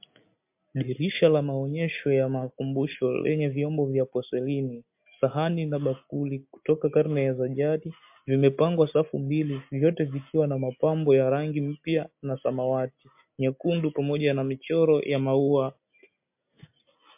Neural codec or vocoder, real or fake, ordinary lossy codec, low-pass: none; real; MP3, 32 kbps; 3.6 kHz